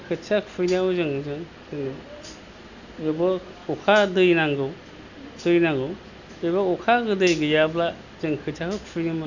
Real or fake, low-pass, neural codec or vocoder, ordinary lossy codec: real; 7.2 kHz; none; none